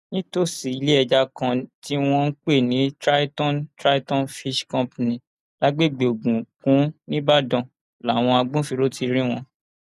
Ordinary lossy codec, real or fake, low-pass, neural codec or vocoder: none; real; 14.4 kHz; none